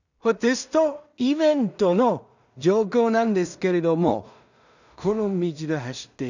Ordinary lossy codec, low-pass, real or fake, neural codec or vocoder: none; 7.2 kHz; fake; codec, 16 kHz in and 24 kHz out, 0.4 kbps, LongCat-Audio-Codec, two codebook decoder